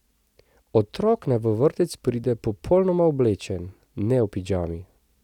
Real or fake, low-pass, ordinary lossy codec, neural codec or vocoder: real; 19.8 kHz; none; none